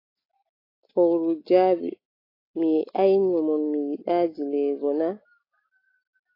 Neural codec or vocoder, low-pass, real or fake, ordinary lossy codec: none; 5.4 kHz; real; AAC, 24 kbps